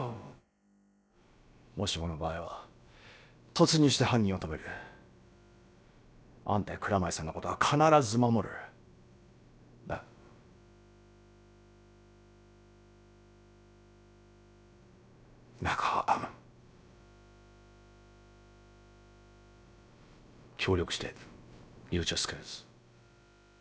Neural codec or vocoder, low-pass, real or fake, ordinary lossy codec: codec, 16 kHz, about 1 kbps, DyCAST, with the encoder's durations; none; fake; none